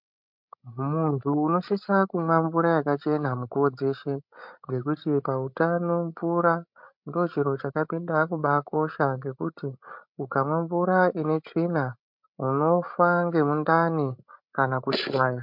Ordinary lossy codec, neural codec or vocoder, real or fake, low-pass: MP3, 48 kbps; codec, 16 kHz, 16 kbps, FreqCodec, larger model; fake; 5.4 kHz